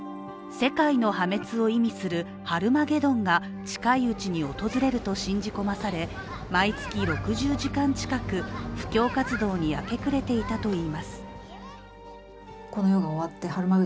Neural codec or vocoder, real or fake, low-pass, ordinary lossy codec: none; real; none; none